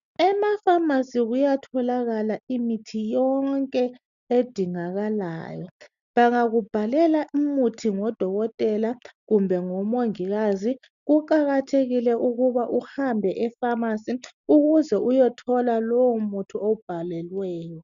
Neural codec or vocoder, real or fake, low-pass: none; real; 7.2 kHz